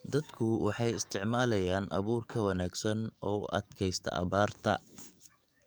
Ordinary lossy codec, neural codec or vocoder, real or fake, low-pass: none; codec, 44.1 kHz, 7.8 kbps, DAC; fake; none